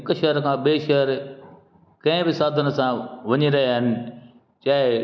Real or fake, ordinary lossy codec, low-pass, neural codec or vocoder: real; none; none; none